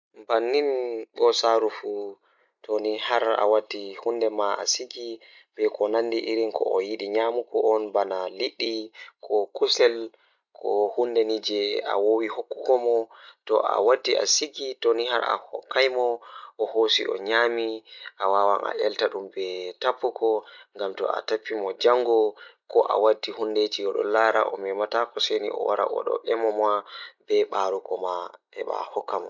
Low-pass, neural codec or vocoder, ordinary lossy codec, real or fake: 7.2 kHz; none; none; real